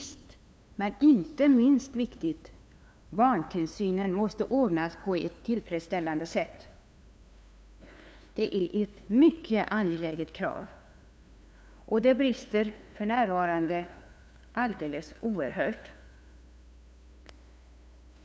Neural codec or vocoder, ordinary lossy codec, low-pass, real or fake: codec, 16 kHz, 2 kbps, FunCodec, trained on LibriTTS, 25 frames a second; none; none; fake